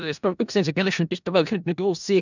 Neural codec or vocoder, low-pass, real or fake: codec, 16 kHz in and 24 kHz out, 0.4 kbps, LongCat-Audio-Codec, four codebook decoder; 7.2 kHz; fake